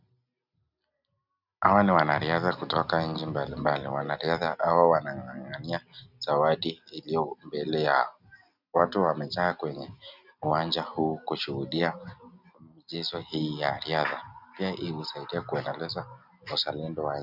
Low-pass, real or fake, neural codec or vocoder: 5.4 kHz; real; none